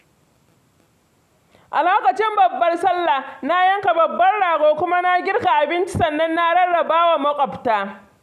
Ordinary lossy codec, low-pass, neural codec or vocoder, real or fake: none; 14.4 kHz; none; real